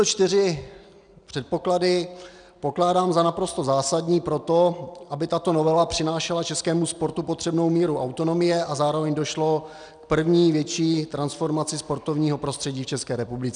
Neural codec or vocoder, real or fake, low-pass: none; real; 9.9 kHz